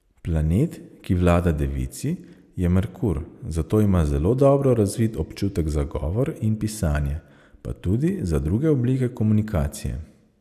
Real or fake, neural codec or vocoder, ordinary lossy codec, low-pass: real; none; none; 14.4 kHz